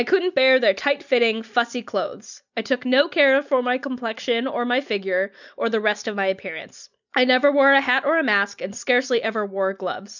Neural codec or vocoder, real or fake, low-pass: none; real; 7.2 kHz